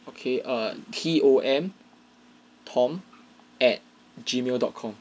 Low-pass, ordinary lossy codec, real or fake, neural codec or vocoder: none; none; real; none